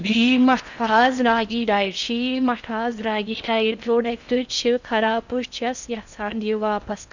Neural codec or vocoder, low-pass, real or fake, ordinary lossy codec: codec, 16 kHz in and 24 kHz out, 0.6 kbps, FocalCodec, streaming, 4096 codes; 7.2 kHz; fake; none